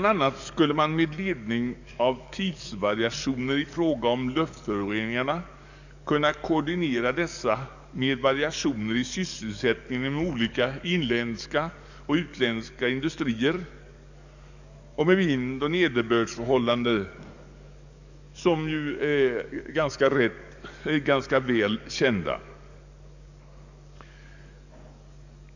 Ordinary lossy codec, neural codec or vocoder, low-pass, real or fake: none; codec, 44.1 kHz, 7.8 kbps, DAC; 7.2 kHz; fake